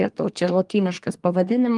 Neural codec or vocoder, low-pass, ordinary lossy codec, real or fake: codec, 24 kHz, 1 kbps, SNAC; 10.8 kHz; Opus, 24 kbps; fake